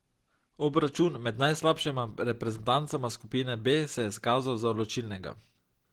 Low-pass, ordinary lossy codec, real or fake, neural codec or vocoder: 19.8 kHz; Opus, 16 kbps; real; none